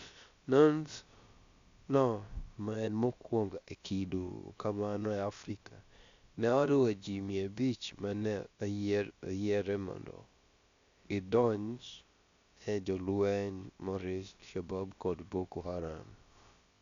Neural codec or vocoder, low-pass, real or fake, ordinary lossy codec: codec, 16 kHz, about 1 kbps, DyCAST, with the encoder's durations; 7.2 kHz; fake; Opus, 64 kbps